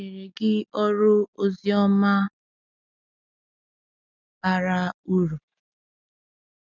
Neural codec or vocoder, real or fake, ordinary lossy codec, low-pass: none; real; none; 7.2 kHz